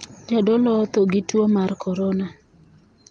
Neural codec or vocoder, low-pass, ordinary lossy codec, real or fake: none; 9.9 kHz; Opus, 32 kbps; real